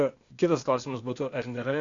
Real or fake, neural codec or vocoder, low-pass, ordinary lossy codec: fake; codec, 16 kHz, 0.8 kbps, ZipCodec; 7.2 kHz; MP3, 64 kbps